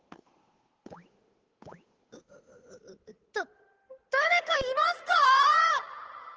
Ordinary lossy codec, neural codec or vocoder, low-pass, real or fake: Opus, 16 kbps; codec, 16 kHz, 8 kbps, FunCodec, trained on Chinese and English, 25 frames a second; 7.2 kHz; fake